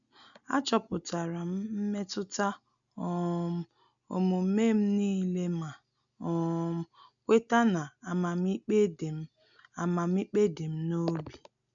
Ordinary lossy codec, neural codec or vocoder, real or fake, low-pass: none; none; real; 7.2 kHz